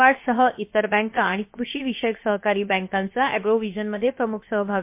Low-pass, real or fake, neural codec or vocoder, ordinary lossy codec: 3.6 kHz; fake; codec, 16 kHz, 0.7 kbps, FocalCodec; MP3, 24 kbps